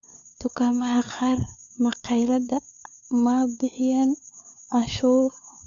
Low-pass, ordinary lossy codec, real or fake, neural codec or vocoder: 7.2 kHz; none; fake; codec, 16 kHz, 4 kbps, FunCodec, trained on LibriTTS, 50 frames a second